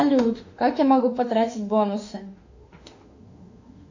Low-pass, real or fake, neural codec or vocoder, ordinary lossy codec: 7.2 kHz; fake; autoencoder, 48 kHz, 32 numbers a frame, DAC-VAE, trained on Japanese speech; AAC, 48 kbps